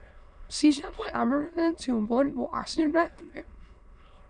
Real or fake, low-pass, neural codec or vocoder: fake; 9.9 kHz; autoencoder, 22.05 kHz, a latent of 192 numbers a frame, VITS, trained on many speakers